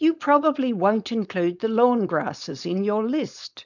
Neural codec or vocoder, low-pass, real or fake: codec, 16 kHz, 4.8 kbps, FACodec; 7.2 kHz; fake